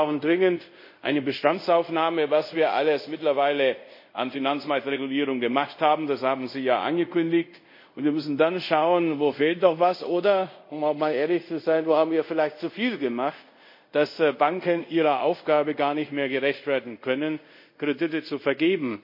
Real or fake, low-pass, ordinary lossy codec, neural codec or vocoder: fake; 5.4 kHz; MP3, 32 kbps; codec, 24 kHz, 0.5 kbps, DualCodec